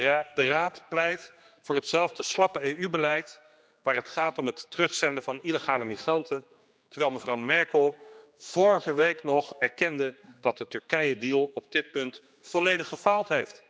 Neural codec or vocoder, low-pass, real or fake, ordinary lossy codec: codec, 16 kHz, 2 kbps, X-Codec, HuBERT features, trained on general audio; none; fake; none